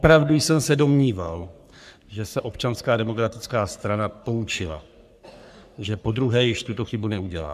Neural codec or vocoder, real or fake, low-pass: codec, 44.1 kHz, 3.4 kbps, Pupu-Codec; fake; 14.4 kHz